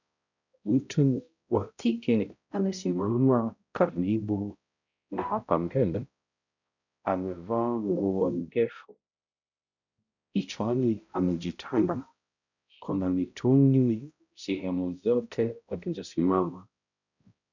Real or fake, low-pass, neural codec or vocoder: fake; 7.2 kHz; codec, 16 kHz, 0.5 kbps, X-Codec, HuBERT features, trained on balanced general audio